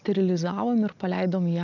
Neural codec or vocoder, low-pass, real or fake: none; 7.2 kHz; real